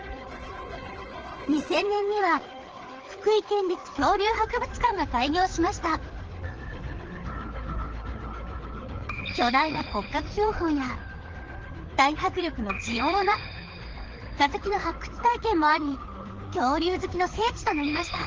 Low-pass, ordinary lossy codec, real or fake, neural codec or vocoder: 7.2 kHz; Opus, 16 kbps; fake; codec, 16 kHz, 4 kbps, FreqCodec, larger model